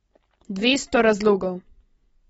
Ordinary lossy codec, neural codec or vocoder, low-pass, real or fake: AAC, 24 kbps; none; 19.8 kHz; real